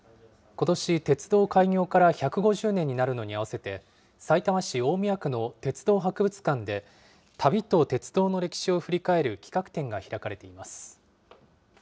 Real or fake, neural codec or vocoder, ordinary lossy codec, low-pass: real; none; none; none